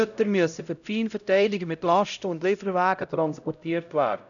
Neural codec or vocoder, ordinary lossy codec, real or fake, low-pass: codec, 16 kHz, 0.5 kbps, X-Codec, HuBERT features, trained on LibriSpeech; none; fake; 7.2 kHz